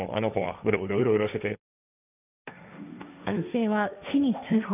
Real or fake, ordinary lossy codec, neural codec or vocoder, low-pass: fake; none; codec, 16 kHz, 1.1 kbps, Voila-Tokenizer; 3.6 kHz